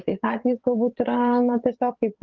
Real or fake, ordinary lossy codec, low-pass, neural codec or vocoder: fake; Opus, 16 kbps; 7.2 kHz; vocoder, 22.05 kHz, 80 mel bands, WaveNeXt